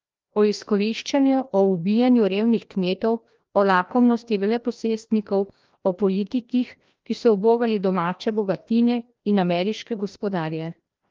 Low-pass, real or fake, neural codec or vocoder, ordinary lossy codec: 7.2 kHz; fake; codec, 16 kHz, 1 kbps, FreqCodec, larger model; Opus, 32 kbps